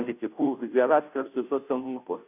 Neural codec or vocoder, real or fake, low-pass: codec, 16 kHz, 0.5 kbps, FunCodec, trained on Chinese and English, 25 frames a second; fake; 3.6 kHz